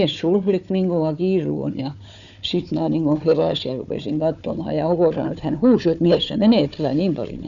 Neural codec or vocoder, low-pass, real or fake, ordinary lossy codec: codec, 16 kHz, 4 kbps, FunCodec, trained on Chinese and English, 50 frames a second; 7.2 kHz; fake; none